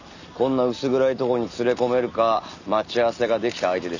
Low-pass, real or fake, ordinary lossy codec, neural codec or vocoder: 7.2 kHz; real; none; none